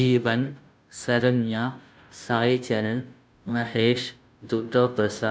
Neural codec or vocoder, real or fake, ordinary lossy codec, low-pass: codec, 16 kHz, 0.5 kbps, FunCodec, trained on Chinese and English, 25 frames a second; fake; none; none